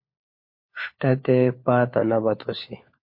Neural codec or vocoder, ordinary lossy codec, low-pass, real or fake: codec, 16 kHz, 4 kbps, FunCodec, trained on LibriTTS, 50 frames a second; MP3, 32 kbps; 5.4 kHz; fake